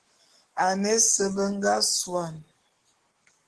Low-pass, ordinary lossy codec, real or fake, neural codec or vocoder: 10.8 kHz; Opus, 16 kbps; fake; codec, 44.1 kHz, 7.8 kbps, DAC